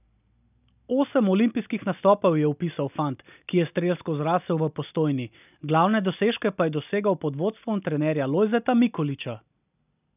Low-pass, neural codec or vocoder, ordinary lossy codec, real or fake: 3.6 kHz; none; none; real